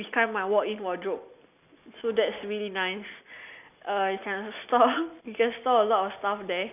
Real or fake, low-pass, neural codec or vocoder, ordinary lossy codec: real; 3.6 kHz; none; none